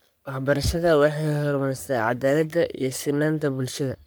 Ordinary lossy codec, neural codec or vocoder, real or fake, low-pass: none; codec, 44.1 kHz, 3.4 kbps, Pupu-Codec; fake; none